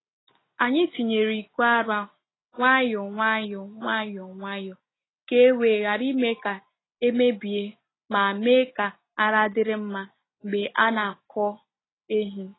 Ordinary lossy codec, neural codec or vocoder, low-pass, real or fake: AAC, 16 kbps; none; 7.2 kHz; real